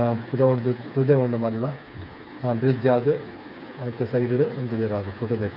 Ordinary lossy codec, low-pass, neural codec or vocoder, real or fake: none; 5.4 kHz; codec, 16 kHz, 4 kbps, FreqCodec, smaller model; fake